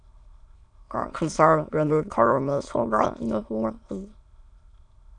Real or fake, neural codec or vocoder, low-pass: fake; autoencoder, 22.05 kHz, a latent of 192 numbers a frame, VITS, trained on many speakers; 9.9 kHz